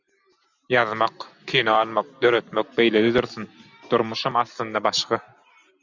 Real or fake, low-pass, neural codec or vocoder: real; 7.2 kHz; none